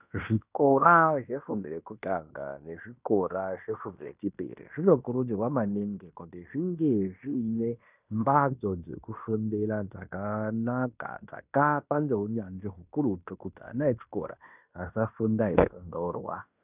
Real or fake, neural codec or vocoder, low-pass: fake; codec, 16 kHz in and 24 kHz out, 0.9 kbps, LongCat-Audio-Codec, fine tuned four codebook decoder; 3.6 kHz